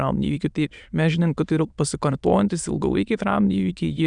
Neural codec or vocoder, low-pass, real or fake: autoencoder, 22.05 kHz, a latent of 192 numbers a frame, VITS, trained on many speakers; 9.9 kHz; fake